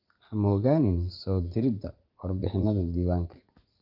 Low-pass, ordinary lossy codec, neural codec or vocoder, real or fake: 5.4 kHz; Opus, 24 kbps; vocoder, 44.1 kHz, 80 mel bands, Vocos; fake